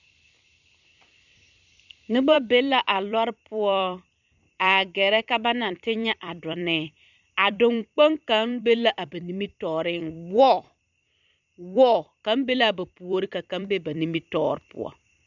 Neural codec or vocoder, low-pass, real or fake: vocoder, 44.1 kHz, 128 mel bands every 256 samples, BigVGAN v2; 7.2 kHz; fake